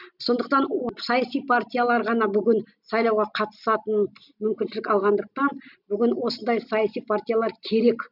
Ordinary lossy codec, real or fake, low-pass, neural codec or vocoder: none; real; 5.4 kHz; none